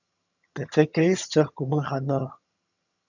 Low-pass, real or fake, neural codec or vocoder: 7.2 kHz; fake; vocoder, 22.05 kHz, 80 mel bands, HiFi-GAN